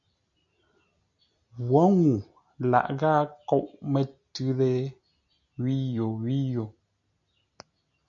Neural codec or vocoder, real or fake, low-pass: none; real; 7.2 kHz